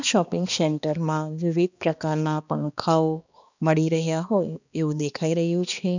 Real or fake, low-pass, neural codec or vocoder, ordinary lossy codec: fake; 7.2 kHz; codec, 16 kHz, 2 kbps, X-Codec, HuBERT features, trained on balanced general audio; none